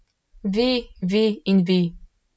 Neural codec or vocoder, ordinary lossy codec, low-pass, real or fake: none; none; none; real